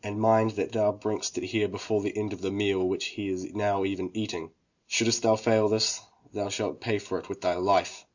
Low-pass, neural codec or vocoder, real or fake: 7.2 kHz; none; real